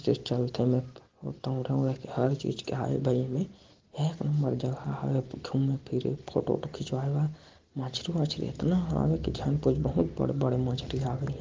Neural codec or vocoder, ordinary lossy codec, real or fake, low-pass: none; Opus, 16 kbps; real; 7.2 kHz